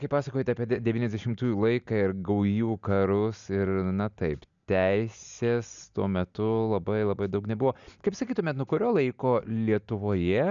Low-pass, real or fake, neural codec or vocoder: 7.2 kHz; real; none